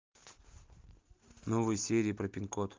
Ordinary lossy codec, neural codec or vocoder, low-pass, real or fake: Opus, 32 kbps; none; 7.2 kHz; real